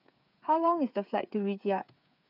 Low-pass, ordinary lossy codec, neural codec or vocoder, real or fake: 5.4 kHz; none; codec, 16 kHz, 8 kbps, FreqCodec, smaller model; fake